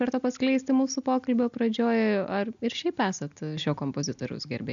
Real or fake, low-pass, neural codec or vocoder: real; 7.2 kHz; none